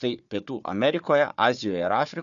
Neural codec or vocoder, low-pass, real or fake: codec, 16 kHz, 4 kbps, FunCodec, trained on Chinese and English, 50 frames a second; 7.2 kHz; fake